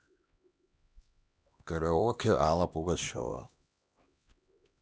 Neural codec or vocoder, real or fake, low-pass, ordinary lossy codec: codec, 16 kHz, 1 kbps, X-Codec, HuBERT features, trained on LibriSpeech; fake; none; none